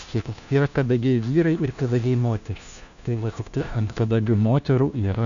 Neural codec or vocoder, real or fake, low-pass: codec, 16 kHz, 1 kbps, FunCodec, trained on LibriTTS, 50 frames a second; fake; 7.2 kHz